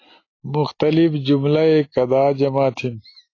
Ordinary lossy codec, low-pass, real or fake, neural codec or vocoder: AAC, 32 kbps; 7.2 kHz; real; none